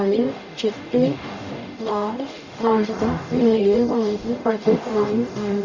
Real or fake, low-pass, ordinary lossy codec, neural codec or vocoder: fake; 7.2 kHz; Opus, 64 kbps; codec, 44.1 kHz, 0.9 kbps, DAC